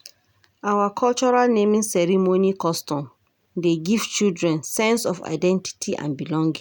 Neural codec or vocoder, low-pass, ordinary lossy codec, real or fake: none; none; none; real